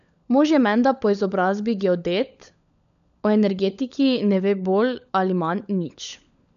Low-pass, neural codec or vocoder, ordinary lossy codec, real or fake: 7.2 kHz; codec, 16 kHz, 16 kbps, FunCodec, trained on LibriTTS, 50 frames a second; none; fake